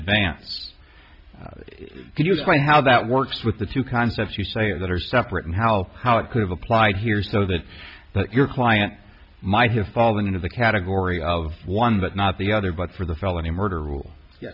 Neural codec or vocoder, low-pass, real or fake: none; 5.4 kHz; real